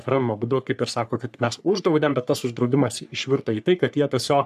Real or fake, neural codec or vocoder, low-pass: fake; codec, 44.1 kHz, 3.4 kbps, Pupu-Codec; 14.4 kHz